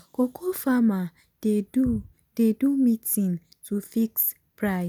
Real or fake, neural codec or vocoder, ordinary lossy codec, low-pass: real; none; none; none